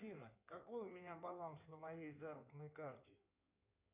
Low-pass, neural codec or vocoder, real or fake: 3.6 kHz; codec, 16 kHz in and 24 kHz out, 2.2 kbps, FireRedTTS-2 codec; fake